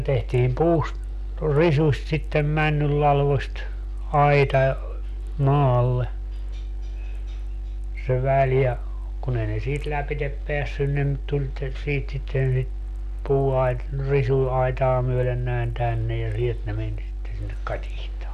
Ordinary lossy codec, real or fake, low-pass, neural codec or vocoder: none; real; 14.4 kHz; none